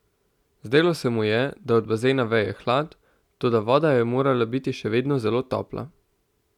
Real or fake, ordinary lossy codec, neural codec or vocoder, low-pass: real; none; none; 19.8 kHz